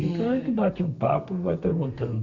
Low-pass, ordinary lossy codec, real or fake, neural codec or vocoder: 7.2 kHz; Opus, 64 kbps; fake; codec, 32 kHz, 1.9 kbps, SNAC